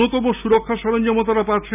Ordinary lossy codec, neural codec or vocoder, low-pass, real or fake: none; none; 3.6 kHz; real